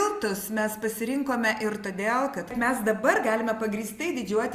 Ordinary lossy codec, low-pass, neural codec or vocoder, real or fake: Opus, 64 kbps; 14.4 kHz; none; real